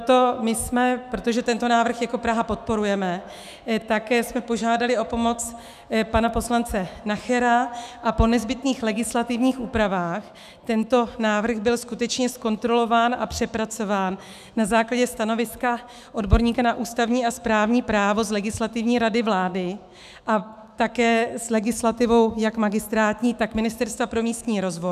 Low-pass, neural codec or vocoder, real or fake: 14.4 kHz; autoencoder, 48 kHz, 128 numbers a frame, DAC-VAE, trained on Japanese speech; fake